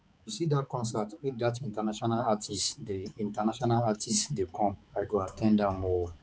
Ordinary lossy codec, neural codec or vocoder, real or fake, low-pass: none; codec, 16 kHz, 4 kbps, X-Codec, HuBERT features, trained on balanced general audio; fake; none